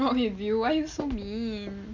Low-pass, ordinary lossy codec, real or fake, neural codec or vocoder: 7.2 kHz; none; real; none